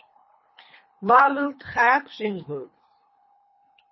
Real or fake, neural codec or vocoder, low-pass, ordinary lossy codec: fake; codec, 24 kHz, 3 kbps, HILCodec; 7.2 kHz; MP3, 24 kbps